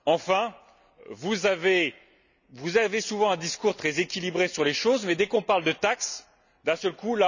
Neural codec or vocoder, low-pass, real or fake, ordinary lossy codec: none; 7.2 kHz; real; none